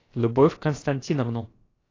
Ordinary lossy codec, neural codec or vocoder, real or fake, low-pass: AAC, 32 kbps; codec, 16 kHz, about 1 kbps, DyCAST, with the encoder's durations; fake; 7.2 kHz